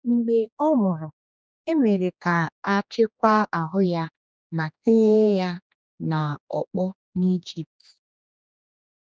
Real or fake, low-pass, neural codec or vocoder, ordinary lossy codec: fake; none; codec, 16 kHz, 2 kbps, X-Codec, HuBERT features, trained on general audio; none